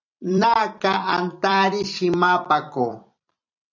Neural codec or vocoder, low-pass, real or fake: vocoder, 44.1 kHz, 128 mel bands every 256 samples, BigVGAN v2; 7.2 kHz; fake